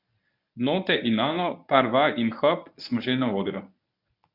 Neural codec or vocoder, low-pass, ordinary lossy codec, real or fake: codec, 24 kHz, 0.9 kbps, WavTokenizer, medium speech release version 1; 5.4 kHz; none; fake